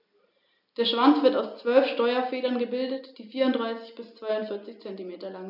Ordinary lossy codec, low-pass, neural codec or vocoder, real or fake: none; 5.4 kHz; none; real